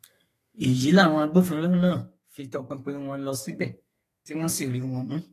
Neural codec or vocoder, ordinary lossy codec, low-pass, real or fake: codec, 32 kHz, 1.9 kbps, SNAC; AAC, 48 kbps; 14.4 kHz; fake